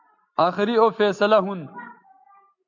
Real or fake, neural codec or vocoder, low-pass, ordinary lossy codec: real; none; 7.2 kHz; MP3, 64 kbps